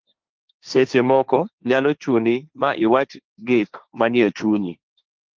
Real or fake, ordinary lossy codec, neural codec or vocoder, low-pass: fake; Opus, 32 kbps; codec, 16 kHz, 1.1 kbps, Voila-Tokenizer; 7.2 kHz